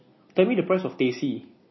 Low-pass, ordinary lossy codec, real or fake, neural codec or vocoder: 7.2 kHz; MP3, 24 kbps; real; none